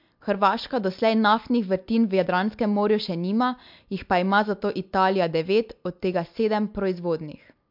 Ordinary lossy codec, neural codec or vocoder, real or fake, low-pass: MP3, 48 kbps; none; real; 5.4 kHz